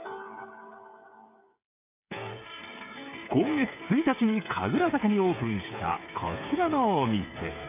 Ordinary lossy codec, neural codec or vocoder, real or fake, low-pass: none; codec, 16 kHz, 8 kbps, FreqCodec, smaller model; fake; 3.6 kHz